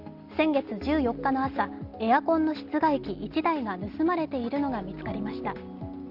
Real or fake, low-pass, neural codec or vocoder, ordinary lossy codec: real; 5.4 kHz; none; Opus, 32 kbps